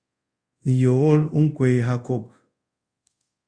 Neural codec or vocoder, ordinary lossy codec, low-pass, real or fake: codec, 24 kHz, 0.5 kbps, DualCodec; Opus, 64 kbps; 9.9 kHz; fake